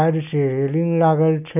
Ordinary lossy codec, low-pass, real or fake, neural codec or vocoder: none; 3.6 kHz; real; none